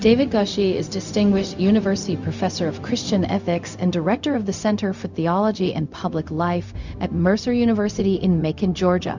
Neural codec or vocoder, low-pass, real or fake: codec, 16 kHz, 0.4 kbps, LongCat-Audio-Codec; 7.2 kHz; fake